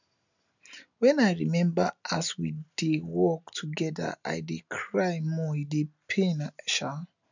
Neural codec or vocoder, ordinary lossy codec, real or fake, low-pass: none; none; real; 7.2 kHz